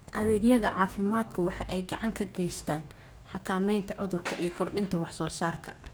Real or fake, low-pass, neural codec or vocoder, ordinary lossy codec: fake; none; codec, 44.1 kHz, 2.6 kbps, DAC; none